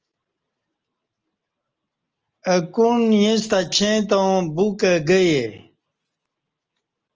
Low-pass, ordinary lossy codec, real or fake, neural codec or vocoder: 7.2 kHz; Opus, 24 kbps; real; none